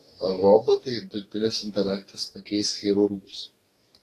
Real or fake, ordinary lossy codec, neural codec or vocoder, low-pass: fake; AAC, 48 kbps; codec, 44.1 kHz, 2.6 kbps, DAC; 14.4 kHz